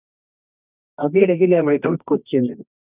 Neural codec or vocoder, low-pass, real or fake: codec, 24 kHz, 0.9 kbps, WavTokenizer, medium music audio release; 3.6 kHz; fake